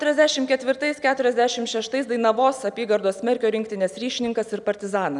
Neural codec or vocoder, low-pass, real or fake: none; 10.8 kHz; real